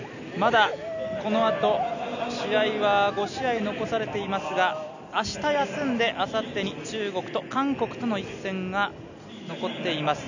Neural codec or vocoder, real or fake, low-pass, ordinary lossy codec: none; real; 7.2 kHz; none